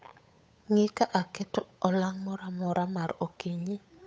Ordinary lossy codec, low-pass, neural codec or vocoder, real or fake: none; none; codec, 16 kHz, 8 kbps, FunCodec, trained on Chinese and English, 25 frames a second; fake